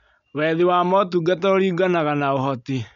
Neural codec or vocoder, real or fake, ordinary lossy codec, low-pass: none; real; none; 7.2 kHz